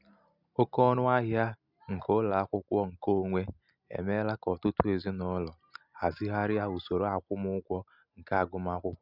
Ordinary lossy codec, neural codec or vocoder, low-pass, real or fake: none; none; 5.4 kHz; real